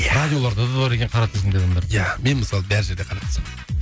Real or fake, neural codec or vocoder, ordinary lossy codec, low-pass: real; none; none; none